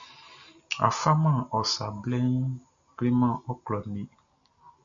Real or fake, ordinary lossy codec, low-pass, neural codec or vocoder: real; AAC, 64 kbps; 7.2 kHz; none